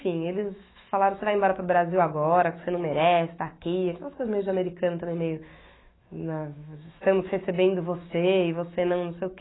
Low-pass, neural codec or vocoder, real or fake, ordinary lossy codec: 7.2 kHz; autoencoder, 48 kHz, 128 numbers a frame, DAC-VAE, trained on Japanese speech; fake; AAC, 16 kbps